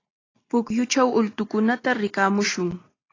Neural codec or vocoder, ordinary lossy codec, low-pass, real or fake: none; AAC, 32 kbps; 7.2 kHz; real